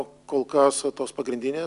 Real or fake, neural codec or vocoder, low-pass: real; none; 10.8 kHz